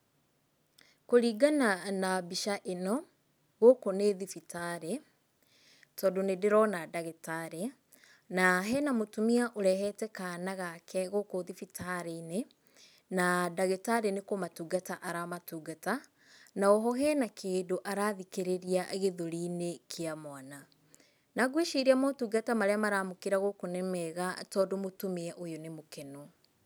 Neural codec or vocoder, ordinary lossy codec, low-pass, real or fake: none; none; none; real